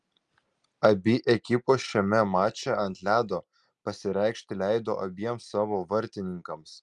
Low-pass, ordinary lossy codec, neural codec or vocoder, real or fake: 10.8 kHz; Opus, 32 kbps; none; real